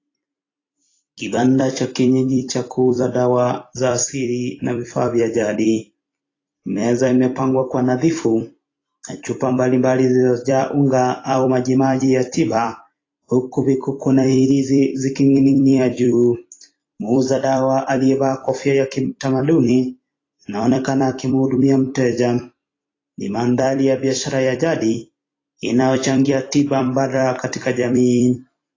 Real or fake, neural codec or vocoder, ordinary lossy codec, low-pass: fake; vocoder, 44.1 kHz, 128 mel bands every 256 samples, BigVGAN v2; AAC, 32 kbps; 7.2 kHz